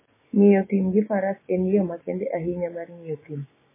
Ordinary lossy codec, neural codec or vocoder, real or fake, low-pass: MP3, 16 kbps; codec, 16 kHz, 6 kbps, DAC; fake; 3.6 kHz